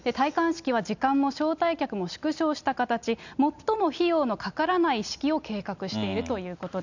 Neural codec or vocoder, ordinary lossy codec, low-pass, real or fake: none; none; 7.2 kHz; real